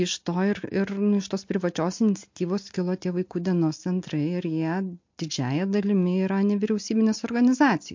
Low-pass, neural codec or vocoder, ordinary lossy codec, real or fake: 7.2 kHz; none; MP3, 48 kbps; real